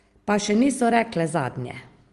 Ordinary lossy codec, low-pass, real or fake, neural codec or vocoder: Opus, 24 kbps; 10.8 kHz; real; none